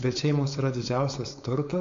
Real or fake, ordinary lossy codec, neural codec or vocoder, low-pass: fake; AAC, 64 kbps; codec, 16 kHz, 4.8 kbps, FACodec; 7.2 kHz